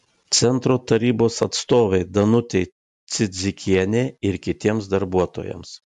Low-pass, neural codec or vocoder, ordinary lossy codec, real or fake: 10.8 kHz; none; AAC, 96 kbps; real